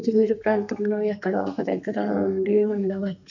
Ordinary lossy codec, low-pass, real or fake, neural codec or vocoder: none; 7.2 kHz; fake; codec, 32 kHz, 1.9 kbps, SNAC